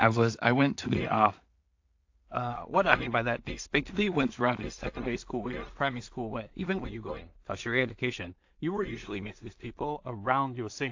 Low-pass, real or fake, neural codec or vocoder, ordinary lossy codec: 7.2 kHz; fake; codec, 16 kHz in and 24 kHz out, 0.4 kbps, LongCat-Audio-Codec, two codebook decoder; AAC, 48 kbps